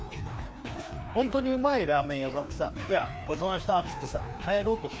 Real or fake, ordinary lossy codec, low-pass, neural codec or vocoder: fake; none; none; codec, 16 kHz, 2 kbps, FreqCodec, larger model